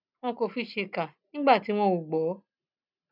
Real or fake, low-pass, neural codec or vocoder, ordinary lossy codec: real; 5.4 kHz; none; none